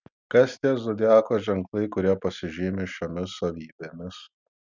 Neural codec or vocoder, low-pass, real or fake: none; 7.2 kHz; real